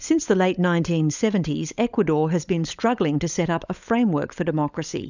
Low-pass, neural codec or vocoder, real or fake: 7.2 kHz; codec, 16 kHz, 16 kbps, FunCodec, trained on LibriTTS, 50 frames a second; fake